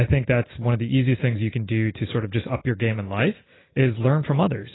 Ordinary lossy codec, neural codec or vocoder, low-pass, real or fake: AAC, 16 kbps; none; 7.2 kHz; real